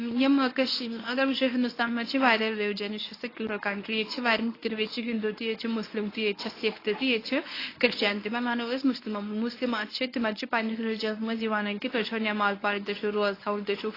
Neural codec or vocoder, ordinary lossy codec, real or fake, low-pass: codec, 24 kHz, 0.9 kbps, WavTokenizer, medium speech release version 1; AAC, 24 kbps; fake; 5.4 kHz